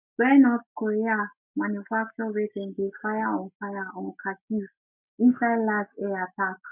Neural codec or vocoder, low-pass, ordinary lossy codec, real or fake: none; 3.6 kHz; none; real